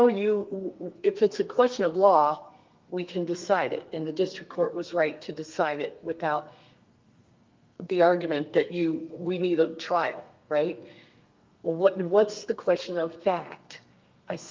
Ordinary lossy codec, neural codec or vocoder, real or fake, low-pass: Opus, 32 kbps; codec, 32 kHz, 1.9 kbps, SNAC; fake; 7.2 kHz